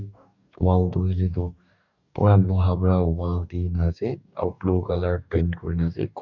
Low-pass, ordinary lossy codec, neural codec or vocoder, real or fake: 7.2 kHz; none; codec, 44.1 kHz, 2.6 kbps, DAC; fake